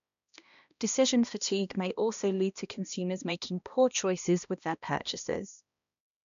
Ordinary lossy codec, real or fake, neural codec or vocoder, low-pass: none; fake; codec, 16 kHz, 1 kbps, X-Codec, HuBERT features, trained on balanced general audio; 7.2 kHz